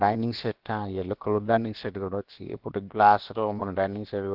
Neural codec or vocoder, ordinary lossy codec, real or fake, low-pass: codec, 16 kHz, about 1 kbps, DyCAST, with the encoder's durations; Opus, 16 kbps; fake; 5.4 kHz